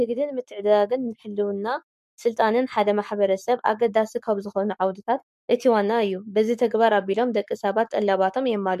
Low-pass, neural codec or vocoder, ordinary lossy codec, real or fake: 14.4 kHz; codec, 44.1 kHz, 7.8 kbps, Pupu-Codec; MP3, 64 kbps; fake